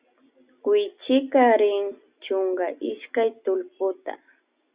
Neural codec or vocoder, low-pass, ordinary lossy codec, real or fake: none; 3.6 kHz; Opus, 64 kbps; real